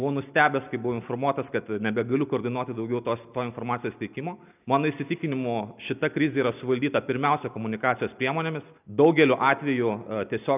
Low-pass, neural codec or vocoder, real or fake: 3.6 kHz; none; real